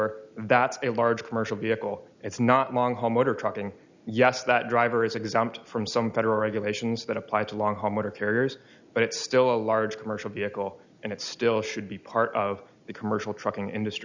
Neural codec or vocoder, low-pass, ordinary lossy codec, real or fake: none; 7.2 kHz; Opus, 64 kbps; real